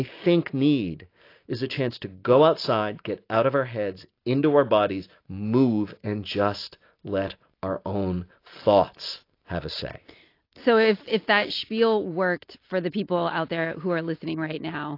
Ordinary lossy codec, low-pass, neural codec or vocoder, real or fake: AAC, 32 kbps; 5.4 kHz; none; real